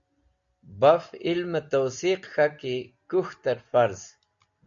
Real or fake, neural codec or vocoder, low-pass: real; none; 7.2 kHz